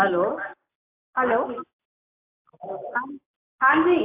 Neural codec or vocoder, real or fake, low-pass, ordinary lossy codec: none; real; 3.6 kHz; AAC, 32 kbps